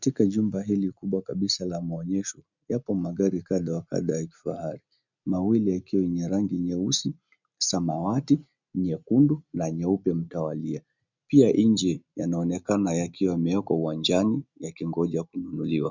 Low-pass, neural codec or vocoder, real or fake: 7.2 kHz; none; real